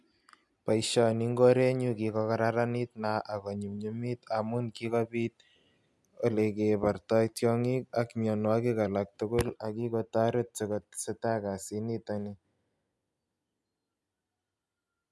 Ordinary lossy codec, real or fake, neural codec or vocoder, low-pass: none; real; none; none